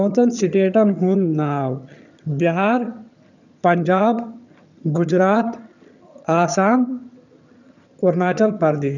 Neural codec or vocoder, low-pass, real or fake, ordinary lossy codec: vocoder, 22.05 kHz, 80 mel bands, HiFi-GAN; 7.2 kHz; fake; none